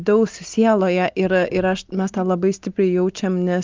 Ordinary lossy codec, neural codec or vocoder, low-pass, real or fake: Opus, 24 kbps; none; 7.2 kHz; real